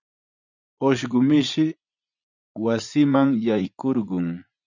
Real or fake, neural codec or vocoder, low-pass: fake; vocoder, 44.1 kHz, 80 mel bands, Vocos; 7.2 kHz